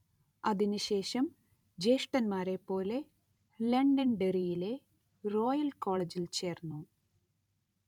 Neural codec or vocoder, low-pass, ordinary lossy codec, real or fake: vocoder, 44.1 kHz, 128 mel bands every 512 samples, BigVGAN v2; 19.8 kHz; none; fake